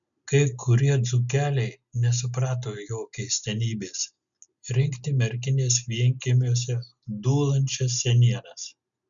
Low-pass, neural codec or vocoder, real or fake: 7.2 kHz; none; real